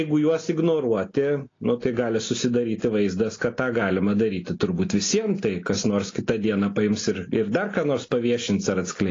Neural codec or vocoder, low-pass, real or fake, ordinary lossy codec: none; 7.2 kHz; real; AAC, 32 kbps